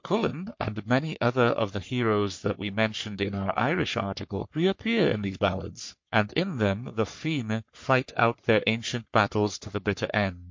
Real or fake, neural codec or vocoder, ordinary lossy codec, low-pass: fake; codec, 44.1 kHz, 3.4 kbps, Pupu-Codec; MP3, 48 kbps; 7.2 kHz